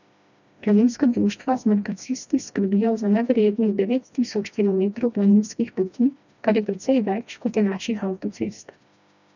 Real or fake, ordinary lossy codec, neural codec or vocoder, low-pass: fake; none; codec, 16 kHz, 1 kbps, FreqCodec, smaller model; 7.2 kHz